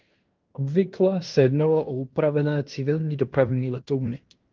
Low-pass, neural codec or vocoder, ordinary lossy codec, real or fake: 7.2 kHz; codec, 16 kHz in and 24 kHz out, 0.9 kbps, LongCat-Audio-Codec, fine tuned four codebook decoder; Opus, 32 kbps; fake